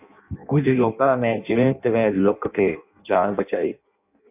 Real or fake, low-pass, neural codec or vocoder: fake; 3.6 kHz; codec, 16 kHz in and 24 kHz out, 0.6 kbps, FireRedTTS-2 codec